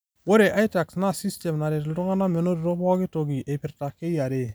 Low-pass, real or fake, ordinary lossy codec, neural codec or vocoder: none; real; none; none